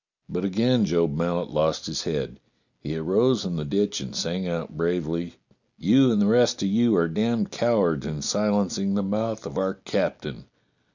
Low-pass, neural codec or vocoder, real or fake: 7.2 kHz; none; real